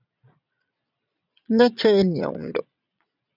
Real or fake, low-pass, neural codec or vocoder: fake; 5.4 kHz; vocoder, 22.05 kHz, 80 mel bands, Vocos